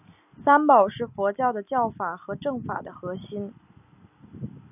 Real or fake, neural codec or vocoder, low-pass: real; none; 3.6 kHz